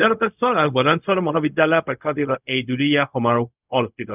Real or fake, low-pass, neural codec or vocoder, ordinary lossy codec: fake; 3.6 kHz; codec, 16 kHz, 0.4 kbps, LongCat-Audio-Codec; none